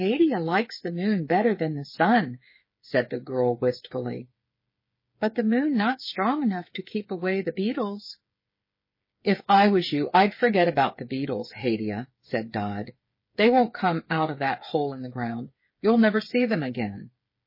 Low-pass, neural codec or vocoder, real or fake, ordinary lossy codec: 5.4 kHz; codec, 16 kHz, 8 kbps, FreqCodec, smaller model; fake; MP3, 24 kbps